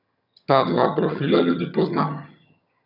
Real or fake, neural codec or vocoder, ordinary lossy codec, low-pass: fake; vocoder, 22.05 kHz, 80 mel bands, HiFi-GAN; none; 5.4 kHz